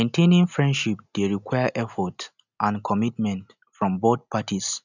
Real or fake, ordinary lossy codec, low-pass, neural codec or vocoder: real; none; 7.2 kHz; none